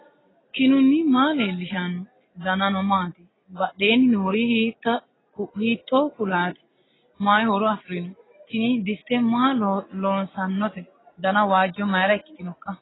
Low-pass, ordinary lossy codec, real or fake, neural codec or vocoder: 7.2 kHz; AAC, 16 kbps; real; none